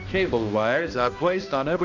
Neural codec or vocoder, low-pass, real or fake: codec, 16 kHz, 1 kbps, X-Codec, HuBERT features, trained on balanced general audio; 7.2 kHz; fake